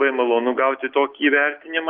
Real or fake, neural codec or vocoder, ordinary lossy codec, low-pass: real; none; Opus, 32 kbps; 5.4 kHz